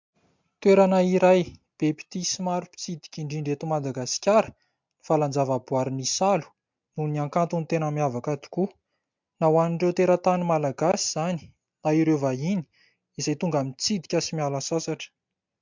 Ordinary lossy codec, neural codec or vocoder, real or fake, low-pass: MP3, 64 kbps; none; real; 7.2 kHz